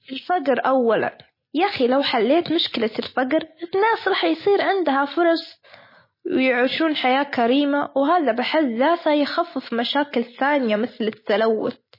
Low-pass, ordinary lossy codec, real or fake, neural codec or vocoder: 5.4 kHz; MP3, 24 kbps; real; none